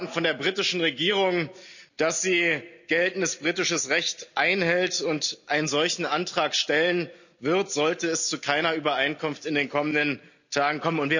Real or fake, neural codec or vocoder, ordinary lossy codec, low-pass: real; none; none; 7.2 kHz